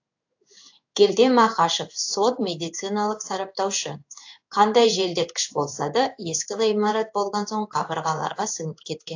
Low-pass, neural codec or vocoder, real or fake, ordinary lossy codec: 7.2 kHz; codec, 16 kHz in and 24 kHz out, 1 kbps, XY-Tokenizer; fake; AAC, 48 kbps